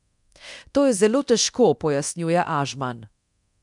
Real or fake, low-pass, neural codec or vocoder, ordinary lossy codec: fake; 10.8 kHz; codec, 24 kHz, 0.9 kbps, DualCodec; none